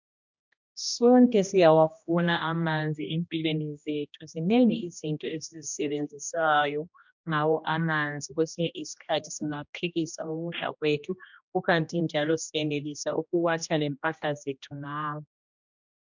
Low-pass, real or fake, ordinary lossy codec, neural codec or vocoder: 7.2 kHz; fake; MP3, 64 kbps; codec, 16 kHz, 1 kbps, X-Codec, HuBERT features, trained on general audio